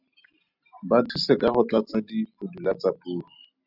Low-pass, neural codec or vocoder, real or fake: 5.4 kHz; none; real